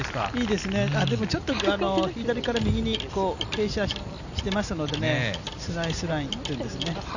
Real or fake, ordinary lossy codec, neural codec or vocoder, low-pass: real; none; none; 7.2 kHz